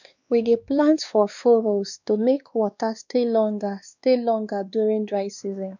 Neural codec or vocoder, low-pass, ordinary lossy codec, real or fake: codec, 16 kHz, 2 kbps, X-Codec, WavLM features, trained on Multilingual LibriSpeech; 7.2 kHz; none; fake